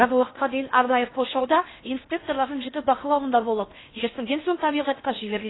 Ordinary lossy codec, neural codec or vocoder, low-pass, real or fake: AAC, 16 kbps; codec, 16 kHz in and 24 kHz out, 0.8 kbps, FocalCodec, streaming, 65536 codes; 7.2 kHz; fake